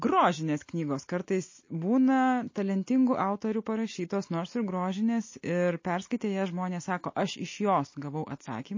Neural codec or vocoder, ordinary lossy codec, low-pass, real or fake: none; MP3, 32 kbps; 7.2 kHz; real